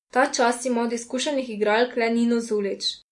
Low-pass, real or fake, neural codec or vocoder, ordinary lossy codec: 10.8 kHz; real; none; MP3, 48 kbps